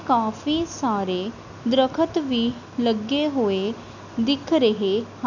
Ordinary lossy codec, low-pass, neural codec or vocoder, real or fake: none; 7.2 kHz; none; real